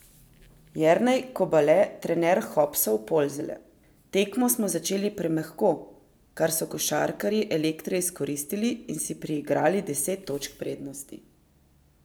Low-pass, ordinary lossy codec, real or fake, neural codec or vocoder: none; none; real; none